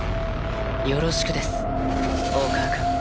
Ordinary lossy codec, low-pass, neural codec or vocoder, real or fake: none; none; none; real